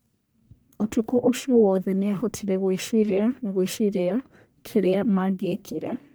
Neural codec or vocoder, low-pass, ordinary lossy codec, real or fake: codec, 44.1 kHz, 1.7 kbps, Pupu-Codec; none; none; fake